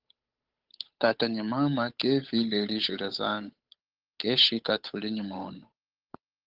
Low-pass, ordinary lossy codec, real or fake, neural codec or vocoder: 5.4 kHz; Opus, 24 kbps; fake; codec, 16 kHz, 8 kbps, FunCodec, trained on Chinese and English, 25 frames a second